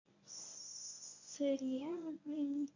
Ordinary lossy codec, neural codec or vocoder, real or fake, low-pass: none; codec, 24 kHz, 0.9 kbps, WavTokenizer, medium speech release version 1; fake; 7.2 kHz